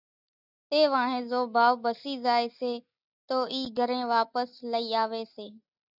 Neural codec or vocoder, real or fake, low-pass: none; real; 5.4 kHz